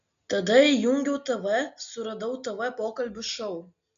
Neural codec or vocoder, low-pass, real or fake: none; 7.2 kHz; real